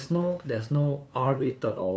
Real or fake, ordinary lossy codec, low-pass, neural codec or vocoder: fake; none; none; codec, 16 kHz, 2 kbps, FunCodec, trained on LibriTTS, 25 frames a second